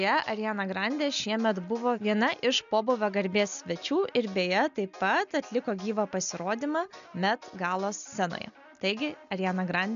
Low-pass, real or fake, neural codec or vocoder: 7.2 kHz; real; none